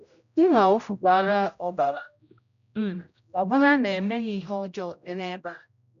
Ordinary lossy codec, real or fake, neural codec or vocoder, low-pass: none; fake; codec, 16 kHz, 0.5 kbps, X-Codec, HuBERT features, trained on general audio; 7.2 kHz